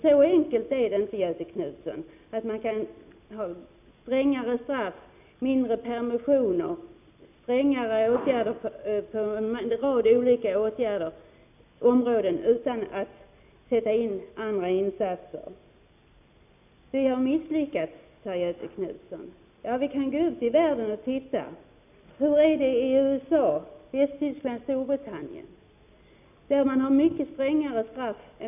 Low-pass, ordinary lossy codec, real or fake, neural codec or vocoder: 3.6 kHz; none; real; none